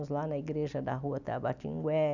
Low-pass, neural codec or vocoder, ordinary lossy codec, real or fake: 7.2 kHz; none; none; real